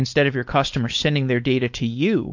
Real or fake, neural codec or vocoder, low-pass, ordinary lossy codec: fake; codec, 16 kHz, 4.8 kbps, FACodec; 7.2 kHz; MP3, 48 kbps